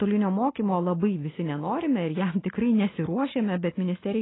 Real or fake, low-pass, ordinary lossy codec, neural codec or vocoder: real; 7.2 kHz; AAC, 16 kbps; none